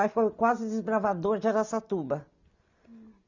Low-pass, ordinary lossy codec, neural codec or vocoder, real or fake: 7.2 kHz; none; none; real